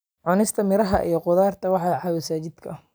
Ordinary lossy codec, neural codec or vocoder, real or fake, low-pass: none; none; real; none